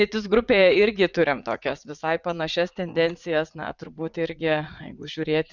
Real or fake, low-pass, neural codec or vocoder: fake; 7.2 kHz; vocoder, 44.1 kHz, 80 mel bands, Vocos